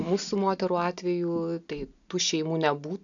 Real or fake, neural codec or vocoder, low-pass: real; none; 7.2 kHz